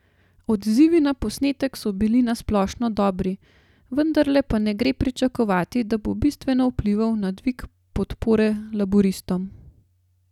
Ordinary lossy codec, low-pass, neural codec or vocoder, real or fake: none; 19.8 kHz; none; real